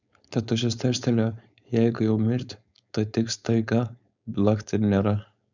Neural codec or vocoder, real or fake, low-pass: codec, 16 kHz, 4.8 kbps, FACodec; fake; 7.2 kHz